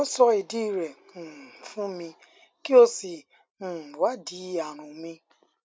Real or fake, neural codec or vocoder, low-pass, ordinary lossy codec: real; none; none; none